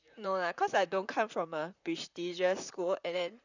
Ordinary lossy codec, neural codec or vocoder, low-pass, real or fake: none; vocoder, 44.1 kHz, 128 mel bands, Pupu-Vocoder; 7.2 kHz; fake